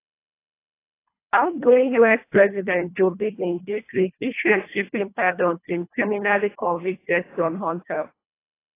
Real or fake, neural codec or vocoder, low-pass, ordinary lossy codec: fake; codec, 24 kHz, 1.5 kbps, HILCodec; 3.6 kHz; AAC, 24 kbps